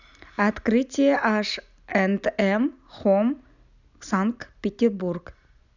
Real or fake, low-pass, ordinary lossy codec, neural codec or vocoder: real; 7.2 kHz; none; none